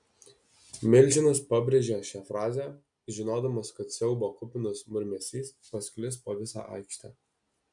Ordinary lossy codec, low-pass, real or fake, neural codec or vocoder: MP3, 96 kbps; 10.8 kHz; real; none